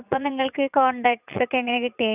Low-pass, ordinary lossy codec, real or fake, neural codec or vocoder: 3.6 kHz; none; fake; vocoder, 44.1 kHz, 80 mel bands, Vocos